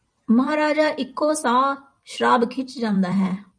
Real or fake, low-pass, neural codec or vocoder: fake; 9.9 kHz; vocoder, 44.1 kHz, 128 mel bands every 256 samples, BigVGAN v2